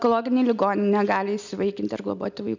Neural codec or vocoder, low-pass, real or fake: none; 7.2 kHz; real